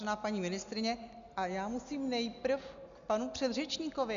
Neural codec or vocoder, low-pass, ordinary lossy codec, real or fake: none; 7.2 kHz; MP3, 96 kbps; real